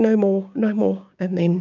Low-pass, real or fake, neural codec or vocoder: 7.2 kHz; fake; codec, 16 kHz, 6 kbps, DAC